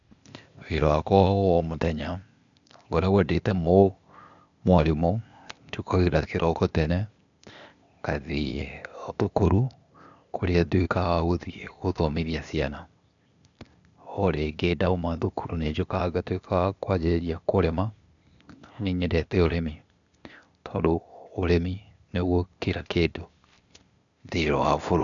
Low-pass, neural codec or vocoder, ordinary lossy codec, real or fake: 7.2 kHz; codec, 16 kHz, 0.8 kbps, ZipCodec; none; fake